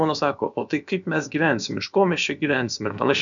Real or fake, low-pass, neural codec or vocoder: fake; 7.2 kHz; codec, 16 kHz, about 1 kbps, DyCAST, with the encoder's durations